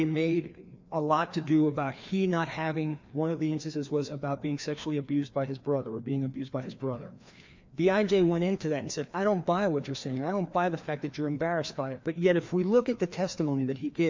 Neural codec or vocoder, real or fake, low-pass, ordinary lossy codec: codec, 16 kHz, 2 kbps, FreqCodec, larger model; fake; 7.2 kHz; MP3, 64 kbps